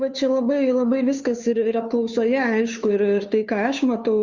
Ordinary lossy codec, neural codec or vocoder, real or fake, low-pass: Opus, 64 kbps; codec, 16 kHz, 2 kbps, FunCodec, trained on Chinese and English, 25 frames a second; fake; 7.2 kHz